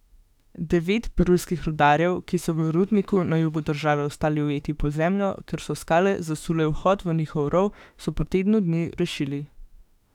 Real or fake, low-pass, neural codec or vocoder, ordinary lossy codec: fake; 19.8 kHz; autoencoder, 48 kHz, 32 numbers a frame, DAC-VAE, trained on Japanese speech; none